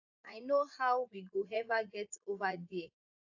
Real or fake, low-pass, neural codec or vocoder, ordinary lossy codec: fake; 7.2 kHz; vocoder, 44.1 kHz, 80 mel bands, Vocos; AAC, 48 kbps